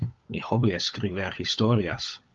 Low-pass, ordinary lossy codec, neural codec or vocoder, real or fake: 7.2 kHz; Opus, 32 kbps; codec, 16 kHz, 16 kbps, FunCodec, trained on LibriTTS, 50 frames a second; fake